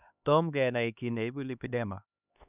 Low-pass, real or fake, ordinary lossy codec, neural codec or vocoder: 3.6 kHz; fake; none; codec, 16 kHz, 2 kbps, X-Codec, HuBERT features, trained on LibriSpeech